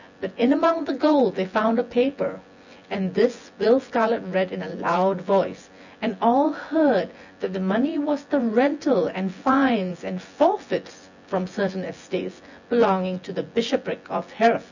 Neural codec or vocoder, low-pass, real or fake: vocoder, 24 kHz, 100 mel bands, Vocos; 7.2 kHz; fake